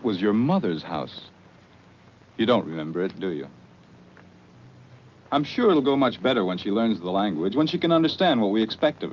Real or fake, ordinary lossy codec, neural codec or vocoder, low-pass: real; Opus, 24 kbps; none; 7.2 kHz